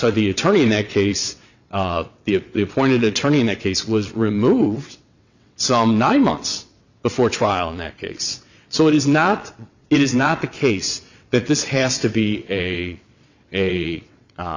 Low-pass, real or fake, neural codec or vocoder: 7.2 kHz; fake; vocoder, 22.05 kHz, 80 mel bands, WaveNeXt